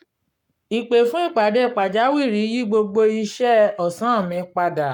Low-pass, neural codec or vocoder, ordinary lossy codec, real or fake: 19.8 kHz; codec, 44.1 kHz, 7.8 kbps, Pupu-Codec; none; fake